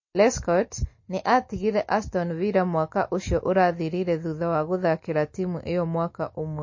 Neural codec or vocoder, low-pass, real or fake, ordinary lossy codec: none; 7.2 kHz; real; MP3, 32 kbps